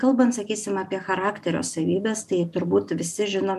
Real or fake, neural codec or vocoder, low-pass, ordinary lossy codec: real; none; 14.4 kHz; MP3, 96 kbps